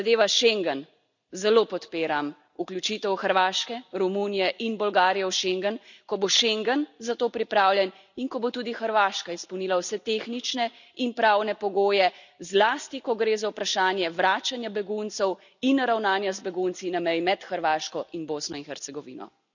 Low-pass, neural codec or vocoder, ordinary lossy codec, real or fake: 7.2 kHz; none; none; real